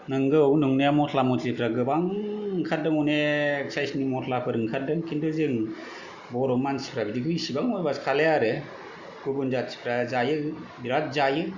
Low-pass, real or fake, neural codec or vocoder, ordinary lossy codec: 7.2 kHz; real; none; Opus, 64 kbps